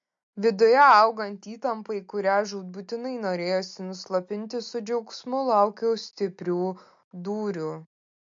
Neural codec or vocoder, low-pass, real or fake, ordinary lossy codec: none; 7.2 kHz; real; MP3, 48 kbps